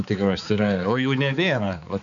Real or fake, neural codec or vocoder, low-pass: fake; codec, 16 kHz, 4 kbps, X-Codec, HuBERT features, trained on balanced general audio; 7.2 kHz